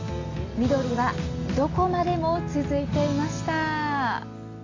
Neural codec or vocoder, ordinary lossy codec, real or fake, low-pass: none; AAC, 32 kbps; real; 7.2 kHz